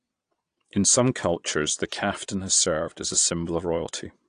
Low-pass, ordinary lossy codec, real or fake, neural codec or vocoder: 9.9 kHz; AAC, 48 kbps; fake; vocoder, 22.05 kHz, 80 mel bands, Vocos